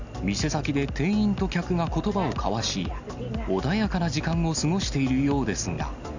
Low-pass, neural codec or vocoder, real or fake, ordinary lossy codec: 7.2 kHz; none; real; none